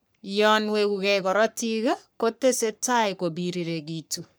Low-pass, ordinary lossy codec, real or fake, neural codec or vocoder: none; none; fake; codec, 44.1 kHz, 3.4 kbps, Pupu-Codec